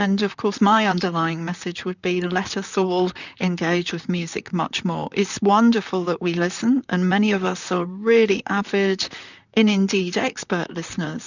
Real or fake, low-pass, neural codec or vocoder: fake; 7.2 kHz; vocoder, 44.1 kHz, 128 mel bands, Pupu-Vocoder